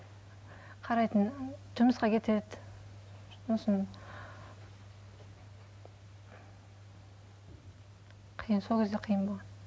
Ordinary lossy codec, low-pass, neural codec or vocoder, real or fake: none; none; none; real